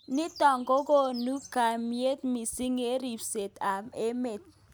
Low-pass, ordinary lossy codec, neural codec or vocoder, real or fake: none; none; none; real